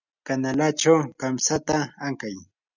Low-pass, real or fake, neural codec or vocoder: 7.2 kHz; real; none